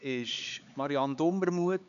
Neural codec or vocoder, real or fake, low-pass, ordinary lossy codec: codec, 16 kHz, 4 kbps, X-Codec, HuBERT features, trained on LibriSpeech; fake; 7.2 kHz; none